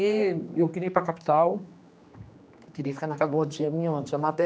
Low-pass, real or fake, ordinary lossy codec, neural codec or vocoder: none; fake; none; codec, 16 kHz, 2 kbps, X-Codec, HuBERT features, trained on general audio